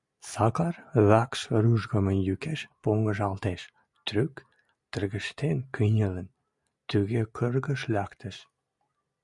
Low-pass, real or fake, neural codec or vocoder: 10.8 kHz; real; none